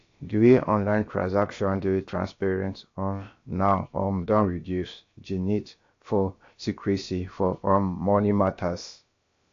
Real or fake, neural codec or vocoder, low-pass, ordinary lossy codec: fake; codec, 16 kHz, about 1 kbps, DyCAST, with the encoder's durations; 7.2 kHz; AAC, 48 kbps